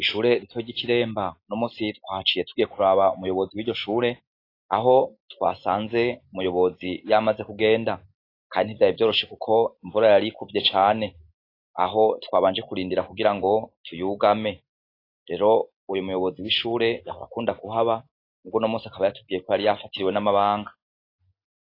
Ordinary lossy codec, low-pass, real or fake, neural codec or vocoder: AAC, 32 kbps; 5.4 kHz; real; none